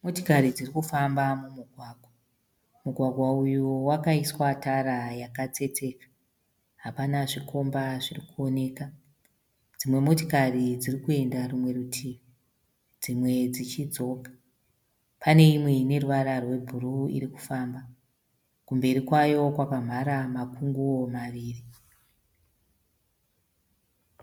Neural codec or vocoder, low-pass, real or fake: none; 19.8 kHz; real